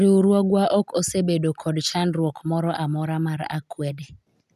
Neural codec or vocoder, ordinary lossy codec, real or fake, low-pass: none; none; real; 14.4 kHz